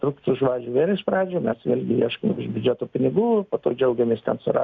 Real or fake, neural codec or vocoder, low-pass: real; none; 7.2 kHz